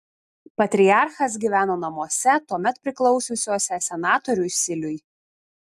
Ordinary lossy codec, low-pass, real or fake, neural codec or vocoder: AAC, 96 kbps; 14.4 kHz; real; none